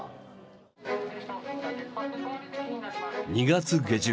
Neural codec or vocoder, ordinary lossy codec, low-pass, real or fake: none; none; none; real